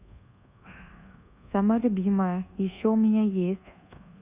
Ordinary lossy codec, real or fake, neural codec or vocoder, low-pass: Opus, 64 kbps; fake; codec, 24 kHz, 1.2 kbps, DualCodec; 3.6 kHz